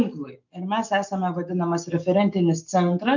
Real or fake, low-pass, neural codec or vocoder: real; 7.2 kHz; none